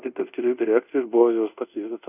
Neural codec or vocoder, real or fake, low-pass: codec, 24 kHz, 0.5 kbps, DualCodec; fake; 3.6 kHz